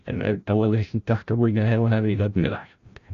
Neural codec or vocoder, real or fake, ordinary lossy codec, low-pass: codec, 16 kHz, 0.5 kbps, FreqCodec, larger model; fake; Opus, 64 kbps; 7.2 kHz